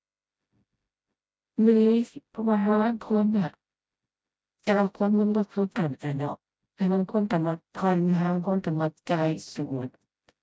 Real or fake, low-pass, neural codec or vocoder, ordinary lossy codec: fake; none; codec, 16 kHz, 0.5 kbps, FreqCodec, smaller model; none